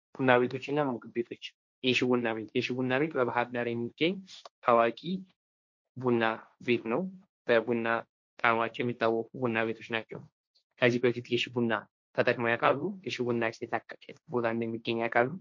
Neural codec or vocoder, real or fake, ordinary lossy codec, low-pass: codec, 16 kHz, 1.1 kbps, Voila-Tokenizer; fake; MP3, 48 kbps; 7.2 kHz